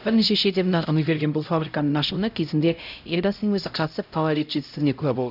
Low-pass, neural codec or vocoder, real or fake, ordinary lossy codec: 5.4 kHz; codec, 16 kHz, 0.5 kbps, X-Codec, HuBERT features, trained on LibriSpeech; fake; none